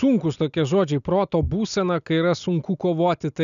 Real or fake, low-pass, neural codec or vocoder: real; 7.2 kHz; none